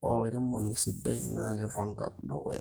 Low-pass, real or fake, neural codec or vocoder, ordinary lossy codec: none; fake; codec, 44.1 kHz, 2.6 kbps, DAC; none